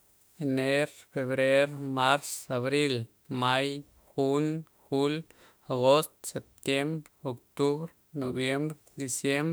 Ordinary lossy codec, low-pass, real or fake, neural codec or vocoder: none; none; fake; autoencoder, 48 kHz, 32 numbers a frame, DAC-VAE, trained on Japanese speech